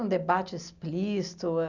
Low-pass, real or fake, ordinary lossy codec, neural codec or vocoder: 7.2 kHz; real; none; none